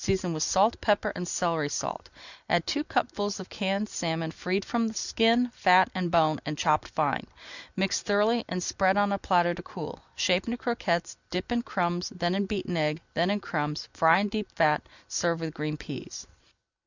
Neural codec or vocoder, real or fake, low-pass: none; real; 7.2 kHz